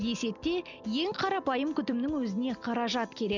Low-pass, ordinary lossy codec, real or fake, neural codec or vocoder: 7.2 kHz; none; real; none